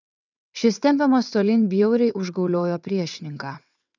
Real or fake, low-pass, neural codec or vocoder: fake; 7.2 kHz; vocoder, 22.05 kHz, 80 mel bands, WaveNeXt